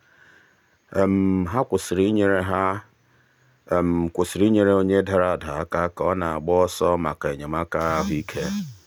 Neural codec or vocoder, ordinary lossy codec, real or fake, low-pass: none; none; real; none